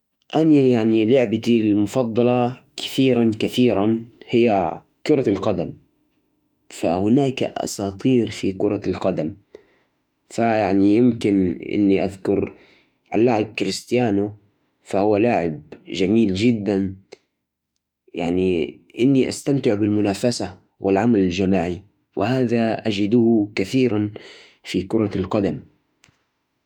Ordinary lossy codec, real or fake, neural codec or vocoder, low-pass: none; fake; autoencoder, 48 kHz, 32 numbers a frame, DAC-VAE, trained on Japanese speech; 19.8 kHz